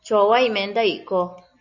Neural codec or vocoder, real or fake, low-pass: none; real; 7.2 kHz